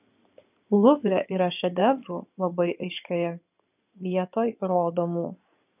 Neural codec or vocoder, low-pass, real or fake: codec, 16 kHz in and 24 kHz out, 2.2 kbps, FireRedTTS-2 codec; 3.6 kHz; fake